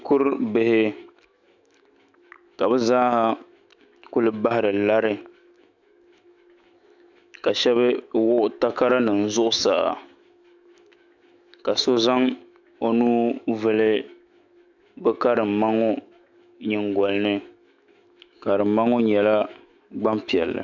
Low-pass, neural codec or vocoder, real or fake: 7.2 kHz; autoencoder, 48 kHz, 128 numbers a frame, DAC-VAE, trained on Japanese speech; fake